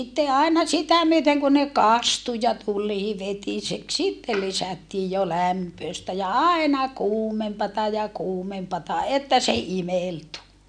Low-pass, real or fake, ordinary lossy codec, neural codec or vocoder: 9.9 kHz; real; none; none